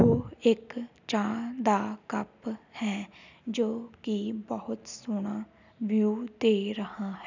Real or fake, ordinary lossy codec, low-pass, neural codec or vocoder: real; none; 7.2 kHz; none